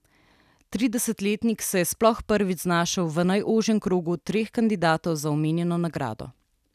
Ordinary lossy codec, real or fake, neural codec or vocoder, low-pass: none; real; none; 14.4 kHz